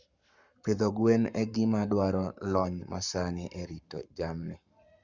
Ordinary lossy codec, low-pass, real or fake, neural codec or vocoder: Opus, 64 kbps; 7.2 kHz; fake; codec, 44.1 kHz, 7.8 kbps, Pupu-Codec